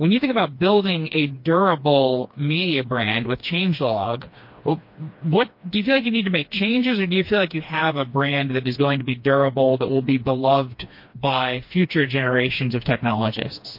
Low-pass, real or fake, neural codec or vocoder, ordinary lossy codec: 5.4 kHz; fake; codec, 16 kHz, 2 kbps, FreqCodec, smaller model; MP3, 32 kbps